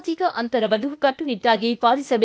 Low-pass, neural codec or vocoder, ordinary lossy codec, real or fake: none; codec, 16 kHz, 0.8 kbps, ZipCodec; none; fake